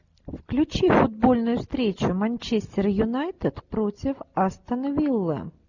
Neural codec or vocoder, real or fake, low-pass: none; real; 7.2 kHz